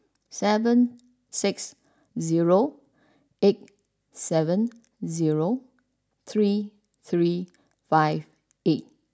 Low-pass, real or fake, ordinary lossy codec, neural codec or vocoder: none; real; none; none